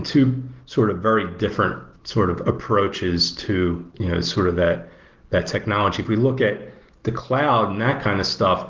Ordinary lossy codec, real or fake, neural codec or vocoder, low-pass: Opus, 16 kbps; real; none; 7.2 kHz